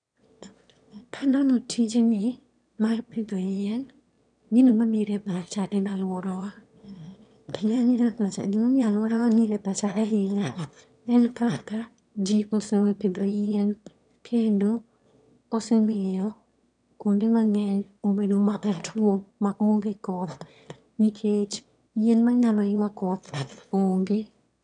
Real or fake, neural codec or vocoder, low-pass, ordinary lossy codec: fake; autoencoder, 22.05 kHz, a latent of 192 numbers a frame, VITS, trained on one speaker; 9.9 kHz; none